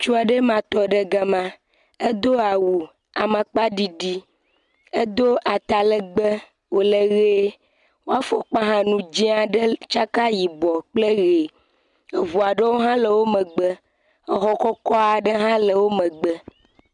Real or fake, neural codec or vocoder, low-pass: real; none; 10.8 kHz